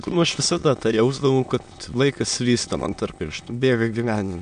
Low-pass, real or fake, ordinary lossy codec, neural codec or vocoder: 9.9 kHz; fake; MP3, 64 kbps; autoencoder, 22.05 kHz, a latent of 192 numbers a frame, VITS, trained on many speakers